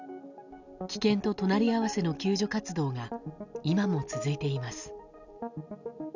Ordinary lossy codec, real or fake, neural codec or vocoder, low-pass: none; real; none; 7.2 kHz